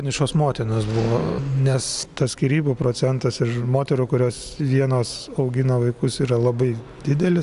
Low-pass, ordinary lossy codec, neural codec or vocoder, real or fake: 10.8 kHz; AAC, 96 kbps; none; real